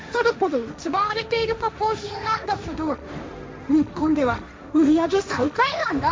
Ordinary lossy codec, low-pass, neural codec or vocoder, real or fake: none; none; codec, 16 kHz, 1.1 kbps, Voila-Tokenizer; fake